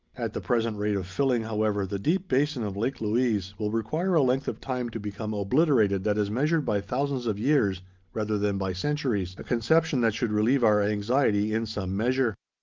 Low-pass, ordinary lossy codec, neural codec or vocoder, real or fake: 7.2 kHz; Opus, 24 kbps; none; real